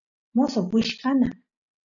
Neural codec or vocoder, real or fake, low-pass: none; real; 7.2 kHz